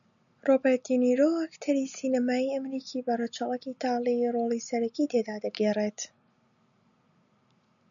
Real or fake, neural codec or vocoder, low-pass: real; none; 7.2 kHz